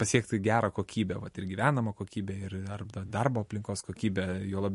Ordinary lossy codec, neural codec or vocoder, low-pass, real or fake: MP3, 48 kbps; vocoder, 44.1 kHz, 128 mel bands every 256 samples, BigVGAN v2; 14.4 kHz; fake